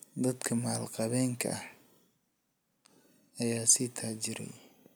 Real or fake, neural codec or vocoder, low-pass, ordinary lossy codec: real; none; none; none